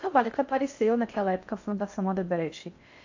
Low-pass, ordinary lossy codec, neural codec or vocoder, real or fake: 7.2 kHz; AAC, 48 kbps; codec, 16 kHz in and 24 kHz out, 0.6 kbps, FocalCodec, streaming, 4096 codes; fake